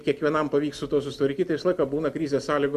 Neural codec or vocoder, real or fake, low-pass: none; real; 14.4 kHz